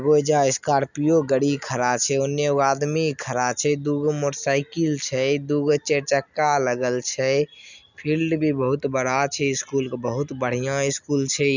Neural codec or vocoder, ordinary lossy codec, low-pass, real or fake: none; none; 7.2 kHz; real